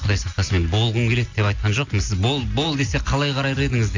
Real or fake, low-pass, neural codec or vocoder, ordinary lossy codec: real; 7.2 kHz; none; AAC, 48 kbps